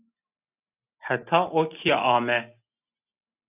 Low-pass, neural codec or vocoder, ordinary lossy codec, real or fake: 3.6 kHz; none; AAC, 32 kbps; real